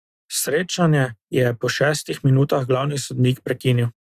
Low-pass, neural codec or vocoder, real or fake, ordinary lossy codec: 14.4 kHz; none; real; Opus, 64 kbps